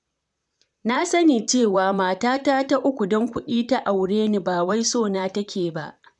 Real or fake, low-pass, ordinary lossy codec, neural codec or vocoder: fake; 10.8 kHz; MP3, 96 kbps; vocoder, 44.1 kHz, 128 mel bands, Pupu-Vocoder